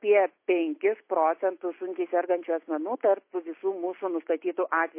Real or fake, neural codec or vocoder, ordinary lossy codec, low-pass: real; none; MP3, 24 kbps; 3.6 kHz